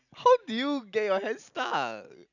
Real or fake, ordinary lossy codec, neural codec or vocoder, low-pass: real; none; none; 7.2 kHz